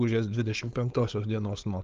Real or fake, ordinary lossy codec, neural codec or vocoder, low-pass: fake; Opus, 24 kbps; codec, 16 kHz, 4.8 kbps, FACodec; 7.2 kHz